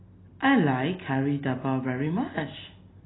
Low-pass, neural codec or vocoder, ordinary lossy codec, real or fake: 7.2 kHz; none; AAC, 16 kbps; real